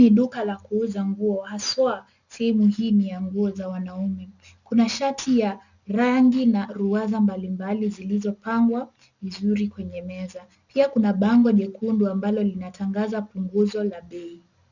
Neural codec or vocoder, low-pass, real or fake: none; 7.2 kHz; real